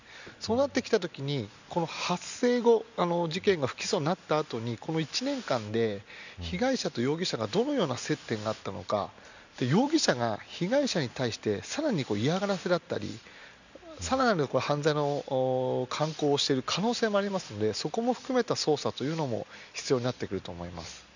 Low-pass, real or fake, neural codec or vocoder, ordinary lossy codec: 7.2 kHz; real; none; none